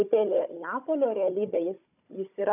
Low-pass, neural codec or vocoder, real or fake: 3.6 kHz; codec, 16 kHz, 16 kbps, FunCodec, trained on Chinese and English, 50 frames a second; fake